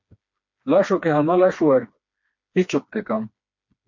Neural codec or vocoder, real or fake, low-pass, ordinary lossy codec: codec, 16 kHz, 2 kbps, FreqCodec, smaller model; fake; 7.2 kHz; MP3, 48 kbps